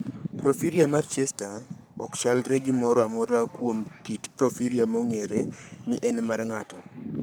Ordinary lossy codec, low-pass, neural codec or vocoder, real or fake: none; none; codec, 44.1 kHz, 3.4 kbps, Pupu-Codec; fake